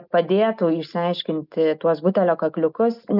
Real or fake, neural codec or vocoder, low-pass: real; none; 5.4 kHz